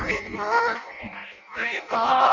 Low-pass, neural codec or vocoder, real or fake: 7.2 kHz; codec, 16 kHz in and 24 kHz out, 0.6 kbps, FireRedTTS-2 codec; fake